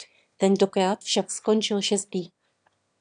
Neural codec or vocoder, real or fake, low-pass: autoencoder, 22.05 kHz, a latent of 192 numbers a frame, VITS, trained on one speaker; fake; 9.9 kHz